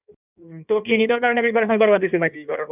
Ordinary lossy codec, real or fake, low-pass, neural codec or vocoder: none; fake; 3.6 kHz; codec, 16 kHz in and 24 kHz out, 1.1 kbps, FireRedTTS-2 codec